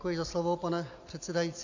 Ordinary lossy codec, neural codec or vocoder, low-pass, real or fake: AAC, 48 kbps; none; 7.2 kHz; real